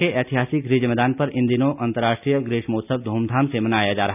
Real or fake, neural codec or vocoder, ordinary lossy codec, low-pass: real; none; none; 3.6 kHz